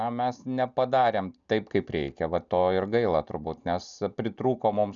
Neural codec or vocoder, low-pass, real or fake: none; 7.2 kHz; real